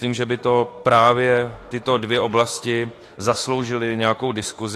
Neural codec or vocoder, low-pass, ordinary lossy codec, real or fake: autoencoder, 48 kHz, 32 numbers a frame, DAC-VAE, trained on Japanese speech; 14.4 kHz; AAC, 48 kbps; fake